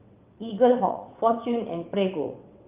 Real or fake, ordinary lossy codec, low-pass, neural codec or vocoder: fake; Opus, 24 kbps; 3.6 kHz; vocoder, 22.05 kHz, 80 mel bands, WaveNeXt